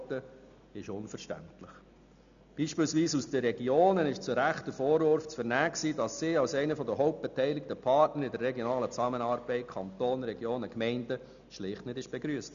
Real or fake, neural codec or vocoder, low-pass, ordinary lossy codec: real; none; 7.2 kHz; none